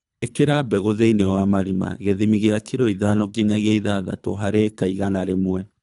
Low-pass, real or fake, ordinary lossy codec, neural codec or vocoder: 10.8 kHz; fake; none; codec, 24 kHz, 3 kbps, HILCodec